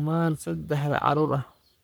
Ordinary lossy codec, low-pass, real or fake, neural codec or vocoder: none; none; fake; codec, 44.1 kHz, 3.4 kbps, Pupu-Codec